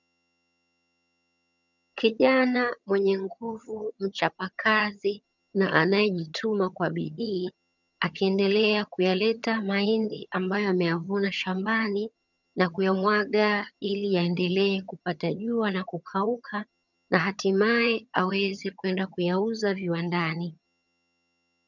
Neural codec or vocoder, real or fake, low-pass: vocoder, 22.05 kHz, 80 mel bands, HiFi-GAN; fake; 7.2 kHz